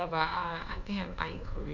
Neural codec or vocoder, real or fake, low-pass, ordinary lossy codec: codec, 24 kHz, 1.2 kbps, DualCodec; fake; 7.2 kHz; none